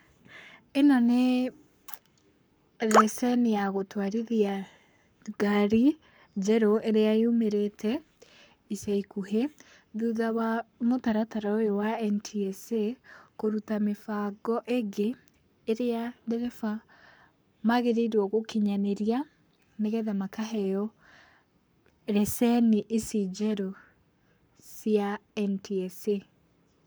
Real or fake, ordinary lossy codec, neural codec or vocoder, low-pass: fake; none; codec, 44.1 kHz, 7.8 kbps, Pupu-Codec; none